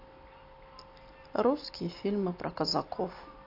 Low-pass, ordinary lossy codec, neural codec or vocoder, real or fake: 5.4 kHz; AAC, 32 kbps; none; real